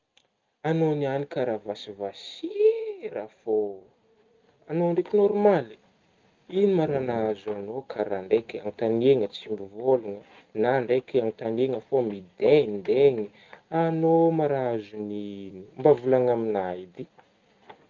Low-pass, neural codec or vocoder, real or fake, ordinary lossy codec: 7.2 kHz; none; real; Opus, 24 kbps